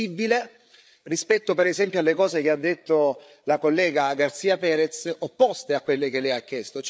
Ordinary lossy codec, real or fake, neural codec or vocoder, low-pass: none; fake; codec, 16 kHz, 8 kbps, FreqCodec, larger model; none